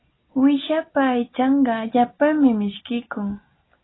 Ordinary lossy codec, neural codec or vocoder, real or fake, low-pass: AAC, 16 kbps; none; real; 7.2 kHz